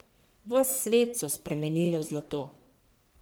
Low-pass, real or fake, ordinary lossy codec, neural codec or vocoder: none; fake; none; codec, 44.1 kHz, 1.7 kbps, Pupu-Codec